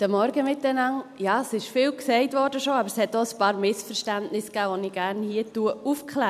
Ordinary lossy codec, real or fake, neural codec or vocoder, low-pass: none; real; none; 14.4 kHz